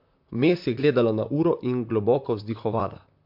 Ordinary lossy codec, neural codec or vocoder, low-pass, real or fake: MP3, 48 kbps; vocoder, 44.1 kHz, 128 mel bands, Pupu-Vocoder; 5.4 kHz; fake